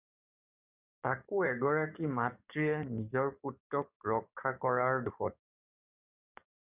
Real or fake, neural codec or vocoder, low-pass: fake; codec, 44.1 kHz, 7.8 kbps, DAC; 3.6 kHz